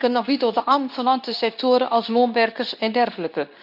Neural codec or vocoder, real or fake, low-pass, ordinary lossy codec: codec, 24 kHz, 0.9 kbps, WavTokenizer, medium speech release version 2; fake; 5.4 kHz; none